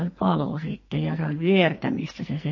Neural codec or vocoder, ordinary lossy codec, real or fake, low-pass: codec, 44.1 kHz, 2.6 kbps, SNAC; MP3, 32 kbps; fake; 7.2 kHz